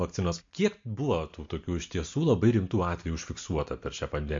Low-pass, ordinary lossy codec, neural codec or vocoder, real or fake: 7.2 kHz; MP3, 48 kbps; none; real